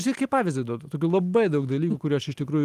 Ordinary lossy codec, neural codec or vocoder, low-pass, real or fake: Opus, 24 kbps; none; 14.4 kHz; real